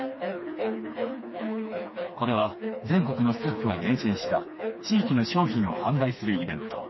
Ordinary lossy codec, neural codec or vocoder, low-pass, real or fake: MP3, 24 kbps; codec, 16 kHz, 2 kbps, FreqCodec, smaller model; 7.2 kHz; fake